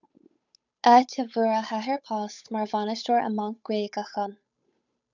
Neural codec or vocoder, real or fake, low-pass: codec, 16 kHz, 8 kbps, FunCodec, trained on Chinese and English, 25 frames a second; fake; 7.2 kHz